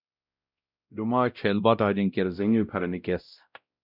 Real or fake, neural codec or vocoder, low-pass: fake; codec, 16 kHz, 0.5 kbps, X-Codec, WavLM features, trained on Multilingual LibriSpeech; 5.4 kHz